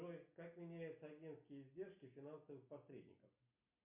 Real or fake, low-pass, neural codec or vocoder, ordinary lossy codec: real; 3.6 kHz; none; MP3, 32 kbps